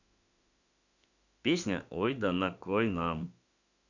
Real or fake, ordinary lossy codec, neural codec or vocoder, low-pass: fake; none; autoencoder, 48 kHz, 32 numbers a frame, DAC-VAE, trained on Japanese speech; 7.2 kHz